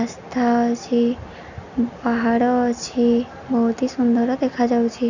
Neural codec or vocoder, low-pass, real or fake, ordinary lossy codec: none; 7.2 kHz; real; none